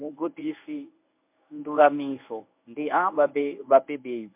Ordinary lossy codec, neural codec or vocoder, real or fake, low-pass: MP3, 32 kbps; codec, 24 kHz, 0.9 kbps, WavTokenizer, medium speech release version 1; fake; 3.6 kHz